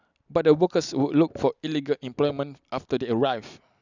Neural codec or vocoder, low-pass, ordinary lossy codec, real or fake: none; 7.2 kHz; none; real